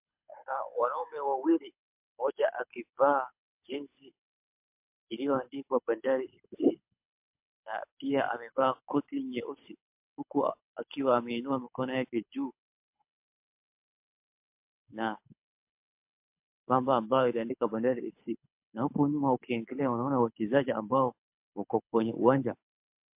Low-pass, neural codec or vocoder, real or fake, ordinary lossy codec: 3.6 kHz; codec, 24 kHz, 6 kbps, HILCodec; fake; MP3, 32 kbps